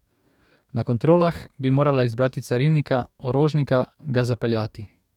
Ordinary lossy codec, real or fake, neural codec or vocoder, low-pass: none; fake; codec, 44.1 kHz, 2.6 kbps, DAC; 19.8 kHz